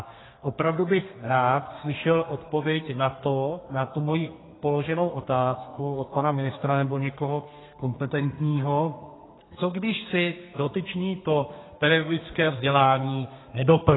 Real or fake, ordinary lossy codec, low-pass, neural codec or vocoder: fake; AAC, 16 kbps; 7.2 kHz; codec, 32 kHz, 1.9 kbps, SNAC